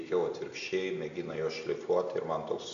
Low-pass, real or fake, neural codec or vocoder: 7.2 kHz; real; none